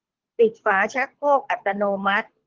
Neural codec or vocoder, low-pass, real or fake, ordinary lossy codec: codec, 24 kHz, 6 kbps, HILCodec; 7.2 kHz; fake; Opus, 16 kbps